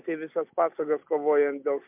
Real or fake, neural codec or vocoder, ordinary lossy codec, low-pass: real; none; MP3, 32 kbps; 3.6 kHz